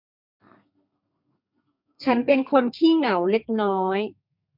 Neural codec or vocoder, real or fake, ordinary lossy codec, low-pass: codec, 32 kHz, 1.9 kbps, SNAC; fake; MP3, 48 kbps; 5.4 kHz